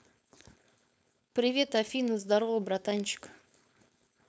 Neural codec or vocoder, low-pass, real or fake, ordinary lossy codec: codec, 16 kHz, 4.8 kbps, FACodec; none; fake; none